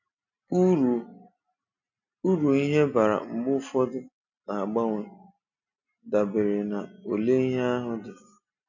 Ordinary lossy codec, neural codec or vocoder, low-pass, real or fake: none; none; 7.2 kHz; real